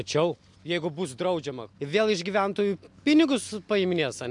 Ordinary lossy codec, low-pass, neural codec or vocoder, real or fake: MP3, 64 kbps; 10.8 kHz; none; real